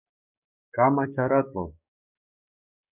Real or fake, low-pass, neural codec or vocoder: fake; 3.6 kHz; codec, 16 kHz, 6 kbps, DAC